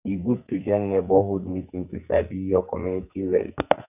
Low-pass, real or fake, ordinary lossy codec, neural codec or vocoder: 3.6 kHz; fake; none; codec, 44.1 kHz, 2.6 kbps, SNAC